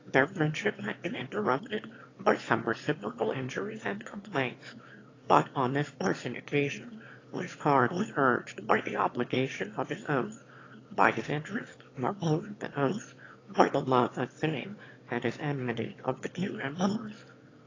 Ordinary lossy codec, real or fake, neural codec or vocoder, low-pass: AAC, 32 kbps; fake; autoencoder, 22.05 kHz, a latent of 192 numbers a frame, VITS, trained on one speaker; 7.2 kHz